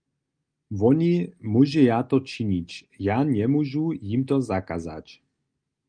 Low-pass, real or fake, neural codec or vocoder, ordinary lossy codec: 9.9 kHz; real; none; Opus, 32 kbps